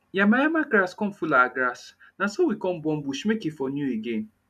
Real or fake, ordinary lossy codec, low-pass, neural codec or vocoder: real; none; 14.4 kHz; none